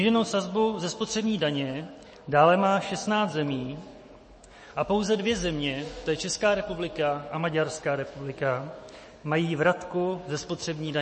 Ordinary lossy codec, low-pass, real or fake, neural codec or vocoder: MP3, 32 kbps; 10.8 kHz; fake; autoencoder, 48 kHz, 128 numbers a frame, DAC-VAE, trained on Japanese speech